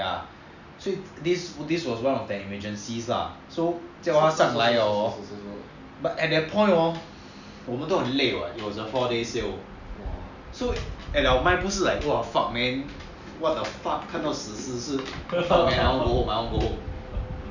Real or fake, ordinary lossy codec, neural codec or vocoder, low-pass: real; none; none; 7.2 kHz